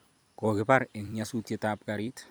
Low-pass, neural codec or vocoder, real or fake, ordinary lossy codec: none; vocoder, 44.1 kHz, 128 mel bands every 256 samples, BigVGAN v2; fake; none